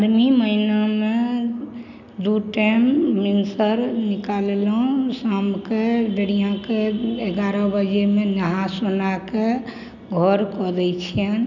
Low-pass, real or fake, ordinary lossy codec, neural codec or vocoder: 7.2 kHz; real; none; none